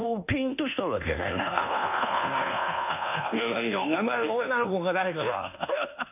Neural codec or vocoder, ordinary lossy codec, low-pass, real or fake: codec, 24 kHz, 1.2 kbps, DualCodec; none; 3.6 kHz; fake